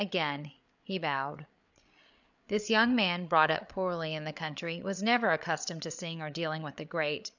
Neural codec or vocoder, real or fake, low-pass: codec, 16 kHz, 8 kbps, FreqCodec, larger model; fake; 7.2 kHz